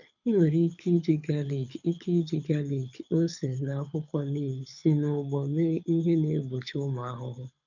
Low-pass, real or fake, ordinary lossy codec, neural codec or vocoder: 7.2 kHz; fake; none; codec, 24 kHz, 6 kbps, HILCodec